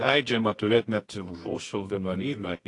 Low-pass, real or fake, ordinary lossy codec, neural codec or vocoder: 10.8 kHz; fake; AAC, 48 kbps; codec, 24 kHz, 0.9 kbps, WavTokenizer, medium music audio release